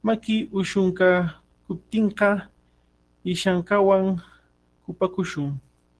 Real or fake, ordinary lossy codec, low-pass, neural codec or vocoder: real; Opus, 16 kbps; 10.8 kHz; none